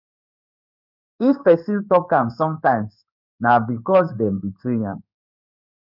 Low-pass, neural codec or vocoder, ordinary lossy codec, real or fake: 5.4 kHz; codec, 16 kHz in and 24 kHz out, 1 kbps, XY-Tokenizer; none; fake